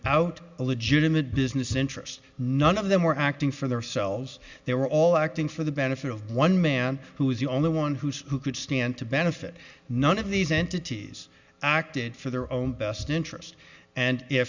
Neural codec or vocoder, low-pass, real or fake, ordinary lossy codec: none; 7.2 kHz; real; Opus, 64 kbps